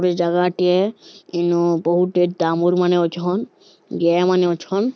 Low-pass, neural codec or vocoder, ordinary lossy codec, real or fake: none; none; none; real